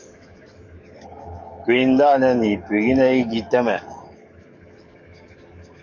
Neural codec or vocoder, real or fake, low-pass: codec, 44.1 kHz, 7.8 kbps, DAC; fake; 7.2 kHz